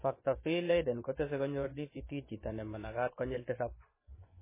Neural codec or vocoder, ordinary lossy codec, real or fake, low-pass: vocoder, 24 kHz, 100 mel bands, Vocos; MP3, 16 kbps; fake; 3.6 kHz